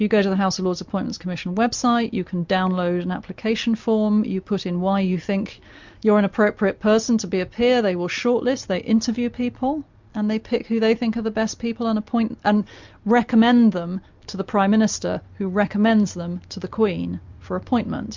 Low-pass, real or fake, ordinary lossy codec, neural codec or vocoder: 7.2 kHz; real; MP3, 64 kbps; none